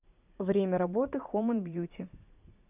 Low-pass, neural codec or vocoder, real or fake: 3.6 kHz; vocoder, 44.1 kHz, 80 mel bands, Vocos; fake